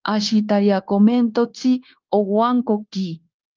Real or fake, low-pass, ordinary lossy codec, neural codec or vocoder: fake; 7.2 kHz; Opus, 32 kbps; codec, 16 kHz, 0.9 kbps, LongCat-Audio-Codec